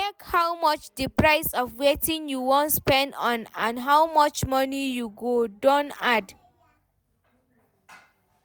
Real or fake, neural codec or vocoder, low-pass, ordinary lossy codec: real; none; none; none